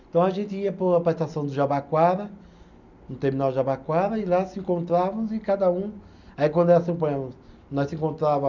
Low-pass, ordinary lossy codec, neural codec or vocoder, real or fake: 7.2 kHz; none; none; real